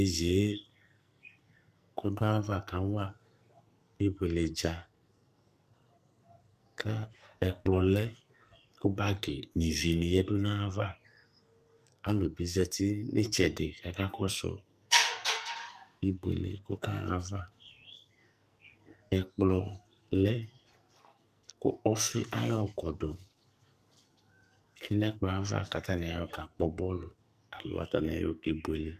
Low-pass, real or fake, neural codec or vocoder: 14.4 kHz; fake; codec, 44.1 kHz, 2.6 kbps, SNAC